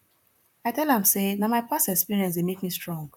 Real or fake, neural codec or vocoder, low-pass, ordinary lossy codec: fake; vocoder, 44.1 kHz, 128 mel bands, Pupu-Vocoder; 19.8 kHz; none